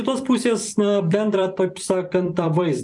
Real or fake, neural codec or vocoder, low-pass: fake; vocoder, 44.1 kHz, 128 mel bands every 256 samples, BigVGAN v2; 10.8 kHz